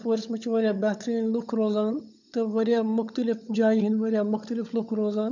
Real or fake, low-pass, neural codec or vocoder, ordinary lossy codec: fake; 7.2 kHz; codec, 16 kHz, 16 kbps, FunCodec, trained on LibriTTS, 50 frames a second; none